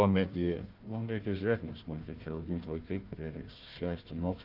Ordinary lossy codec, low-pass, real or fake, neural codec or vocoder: Opus, 32 kbps; 5.4 kHz; fake; codec, 16 kHz, 1 kbps, FunCodec, trained on Chinese and English, 50 frames a second